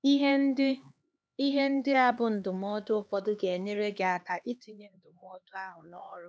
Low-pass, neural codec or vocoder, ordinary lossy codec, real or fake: none; codec, 16 kHz, 2 kbps, X-Codec, HuBERT features, trained on LibriSpeech; none; fake